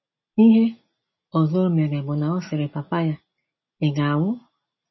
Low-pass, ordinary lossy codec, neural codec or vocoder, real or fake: 7.2 kHz; MP3, 24 kbps; none; real